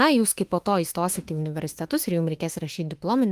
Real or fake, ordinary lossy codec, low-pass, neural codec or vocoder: fake; Opus, 24 kbps; 14.4 kHz; autoencoder, 48 kHz, 32 numbers a frame, DAC-VAE, trained on Japanese speech